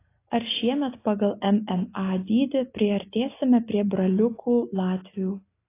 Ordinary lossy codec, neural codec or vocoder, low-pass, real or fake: AAC, 24 kbps; none; 3.6 kHz; real